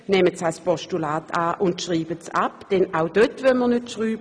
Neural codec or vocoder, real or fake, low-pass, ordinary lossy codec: none; real; none; none